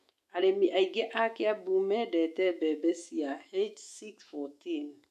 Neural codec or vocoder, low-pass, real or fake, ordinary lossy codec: autoencoder, 48 kHz, 128 numbers a frame, DAC-VAE, trained on Japanese speech; 14.4 kHz; fake; AAC, 96 kbps